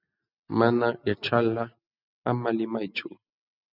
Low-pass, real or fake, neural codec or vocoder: 5.4 kHz; real; none